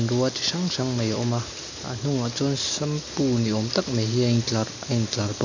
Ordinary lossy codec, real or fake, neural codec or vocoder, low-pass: none; real; none; 7.2 kHz